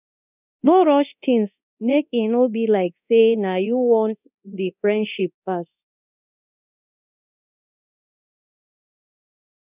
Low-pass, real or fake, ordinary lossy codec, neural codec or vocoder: 3.6 kHz; fake; none; codec, 24 kHz, 0.9 kbps, DualCodec